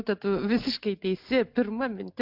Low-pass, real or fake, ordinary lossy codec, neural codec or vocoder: 5.4 kHz; real; MP3, 48 kbps; none